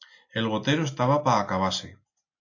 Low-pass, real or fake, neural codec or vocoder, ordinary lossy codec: 7.2 kHz; real; none; AAC, 48 kbps